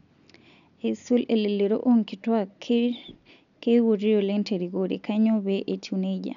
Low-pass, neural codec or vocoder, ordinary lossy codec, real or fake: 7.2 kHz; none; none; real